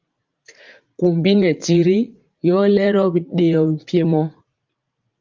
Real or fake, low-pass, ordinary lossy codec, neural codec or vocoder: fake; 7.2 kHz; Opus, 24 kbps; vocoder, 44.1 kHz, 80 mel bands, Vocos